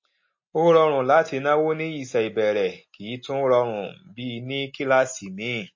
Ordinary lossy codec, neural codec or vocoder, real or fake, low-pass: MP3, 32 kbps; none; real; 7.2 kHz